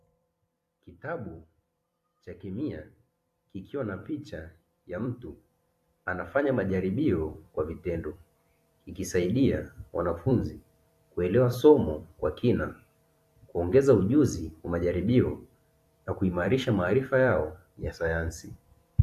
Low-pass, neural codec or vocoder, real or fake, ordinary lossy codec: 14.4 kHz; vocoder, 44.1 kHz, 128 mel bands every 256 samples, BigVGAN v2; fake; AAC, 64 kbps